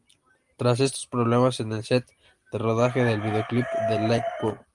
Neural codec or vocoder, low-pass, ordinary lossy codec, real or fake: none; 10.8 kHz; Opus, 32 kbps; real